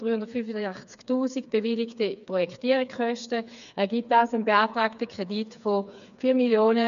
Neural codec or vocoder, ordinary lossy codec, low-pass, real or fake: codec, 16 kHz, 4 kbps, FreqCodec, smaller model; none; 7.2 kHz; fake